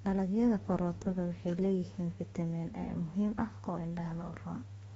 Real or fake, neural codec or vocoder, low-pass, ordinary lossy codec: fake; autoencoder, 48 kHz, 32 numbers a frame, DAC-VAE, trained on Japanese speech; 19.8 kHz; AAC, 24 kbps